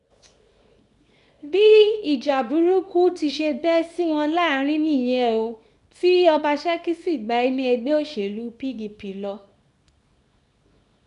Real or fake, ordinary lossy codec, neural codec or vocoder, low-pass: fake; none; codec, 24 kHz, 0.9 kbps, WavTokenizer, small release; 10.8 kHz